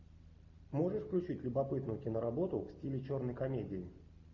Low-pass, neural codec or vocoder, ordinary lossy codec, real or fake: 7.2 kHz; none; AAC, 48 kbps; real